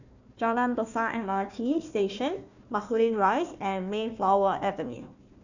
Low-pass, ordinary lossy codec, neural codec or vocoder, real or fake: 7.2 kHz; none; codec, 16 kHz, 1 kbps, FunCodec, trained on Chinese and English, 50 frames a second; fake